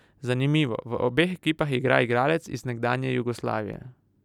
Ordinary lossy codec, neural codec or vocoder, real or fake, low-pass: none; none; real; 19.8 kHz